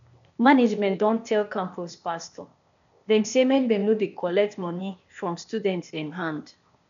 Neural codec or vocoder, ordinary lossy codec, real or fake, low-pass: codec, 16 kHz, 0.8 kbps, ZipCodec; none; fake; 7.2 kHz